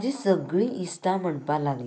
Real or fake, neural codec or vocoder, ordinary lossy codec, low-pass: real; none; none; none